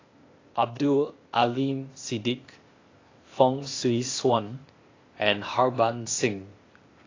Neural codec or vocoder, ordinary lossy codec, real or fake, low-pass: codec, 16 kHz, 0.8 kbps, ZipCodec; AAC, 32 kbps; fake; 7.2 kHz